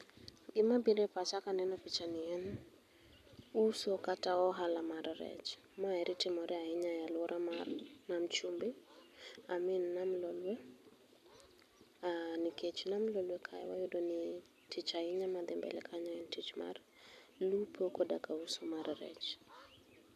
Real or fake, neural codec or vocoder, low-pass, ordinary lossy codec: real; none; 14.4 kHz; MP3, 96 kbps